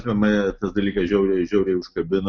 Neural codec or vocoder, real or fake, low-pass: none; real; 7.2 kHz